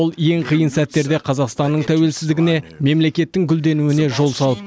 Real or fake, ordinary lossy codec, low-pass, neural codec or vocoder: real; none; none; none